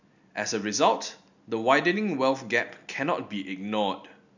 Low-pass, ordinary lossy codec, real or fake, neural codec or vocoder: 7.2 kHz; none; real; none